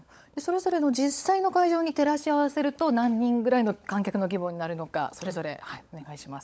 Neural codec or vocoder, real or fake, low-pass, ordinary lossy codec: codec, 16 kHz, 16 kbps, FunCodec, trained on LibriTTS, 50 frames a second; fake; none; none